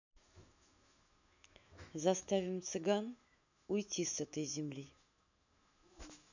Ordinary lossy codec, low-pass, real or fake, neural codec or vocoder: none; 7.2 kHz; fake; autoencoder, 48 kHz, 128 numbers a frame, DAC-VAE, trained on Japanese speech